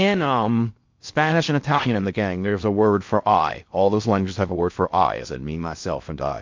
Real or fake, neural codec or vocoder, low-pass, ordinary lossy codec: fake; codec, 16 kHz in and 24 kHz out, 0.6 kbps, FocalCodec, streaming, 2048 codes; 7.2 kHz; MP3, 48 kbps